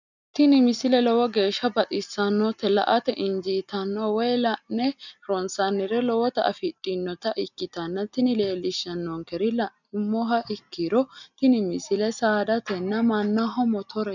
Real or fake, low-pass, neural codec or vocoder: real; 7.2 kHz; none